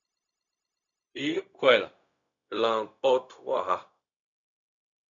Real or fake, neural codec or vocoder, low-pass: fake; codec, 16 kHz, 0.4 kbps, LongCat-Audio-Codec; 7.2 kHz